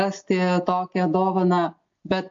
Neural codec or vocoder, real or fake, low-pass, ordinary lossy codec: none; real; 7.2 kHz; MP3, 48 kbps